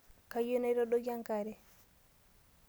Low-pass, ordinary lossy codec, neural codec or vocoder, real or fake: none; none; none; real